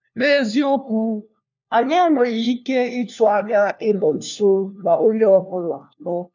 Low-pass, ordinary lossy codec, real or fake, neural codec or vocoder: 7.2 kHz; none; fake; codec, 16 kHz, 1 kbps, FunCodec, trained on LibriTTS, 50 frames a second